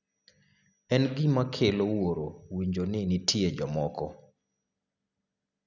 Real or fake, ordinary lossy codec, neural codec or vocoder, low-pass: real; none; none; 7.2 kHz